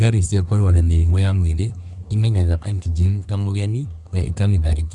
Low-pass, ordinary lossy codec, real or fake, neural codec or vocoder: 10.8 kHz; none; fake; codec, 24 kHz, 1 kbps, SNAC